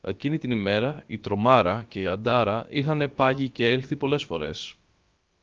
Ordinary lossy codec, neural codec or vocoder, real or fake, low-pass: Opus, 24 kbps; codec, 16 kHz, about 1 kbps, DyCAST, with the encoder's durations; fake; 7.2 kHz